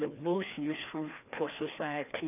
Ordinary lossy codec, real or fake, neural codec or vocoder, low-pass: none; fake; codec, 16 kHz, 2 kbps, FreqCodec, larger model; 3.6 kHz